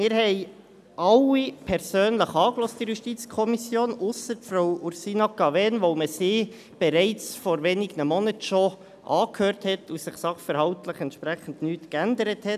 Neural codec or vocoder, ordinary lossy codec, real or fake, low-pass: none; none; real; 14.4 kHz